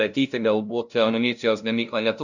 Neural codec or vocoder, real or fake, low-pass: codec, 16 kHz, 0.5 kbps, FunCodec, trained on LibriTTS, 25 frames a second; fake; 7.2 kHz